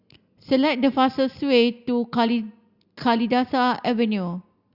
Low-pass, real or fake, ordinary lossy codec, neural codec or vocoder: 5.4 kHz; real; Opus, 64 kbps; none